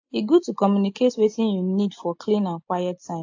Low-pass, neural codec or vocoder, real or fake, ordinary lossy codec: 7.2 kHz; none; real; AAC, 48 kbps